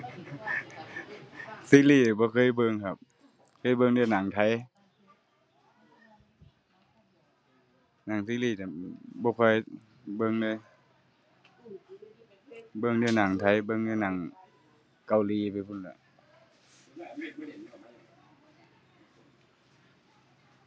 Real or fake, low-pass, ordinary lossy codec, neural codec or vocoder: real; none; none; none